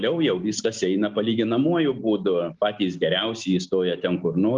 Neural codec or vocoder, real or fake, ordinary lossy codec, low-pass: none; real; Opus, 32 kbps; 7.2 kHz